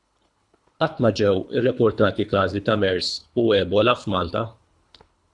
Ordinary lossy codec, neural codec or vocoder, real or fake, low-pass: Opus, 64 kbps; codec, 24 kHz, 3 kbps, HILCodec; fake; 10.8 kHz